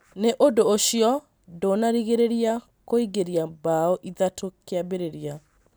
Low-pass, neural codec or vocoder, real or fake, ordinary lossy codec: none; none; real; none